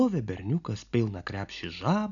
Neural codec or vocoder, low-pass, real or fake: none; 7.2 kHz; real